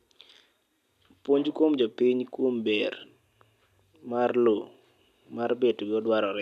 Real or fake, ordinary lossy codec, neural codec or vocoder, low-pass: real; none; none; 14.4 kHz